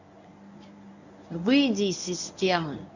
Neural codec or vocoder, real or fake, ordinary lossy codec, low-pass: codec, 24 kHz, 0.9 kbps, WavTokenizer, medium speech release version 1; fake; none; 7.2 kHz